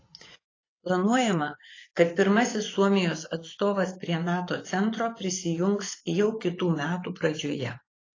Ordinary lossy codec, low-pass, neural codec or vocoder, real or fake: AAC, 32 kbps; 7.2 kHz; vocoder, 24 kHz, 100 mel bands, Vocos; fake